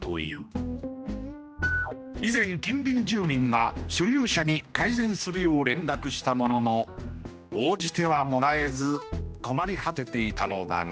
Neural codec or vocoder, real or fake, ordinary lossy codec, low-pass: codec, 16 kHz, 1 kbps, X-Codec, HuBERT features, trained on general audio; fake; none; none